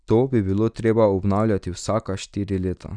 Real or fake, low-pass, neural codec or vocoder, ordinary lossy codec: real; 9.9 kHz; none; none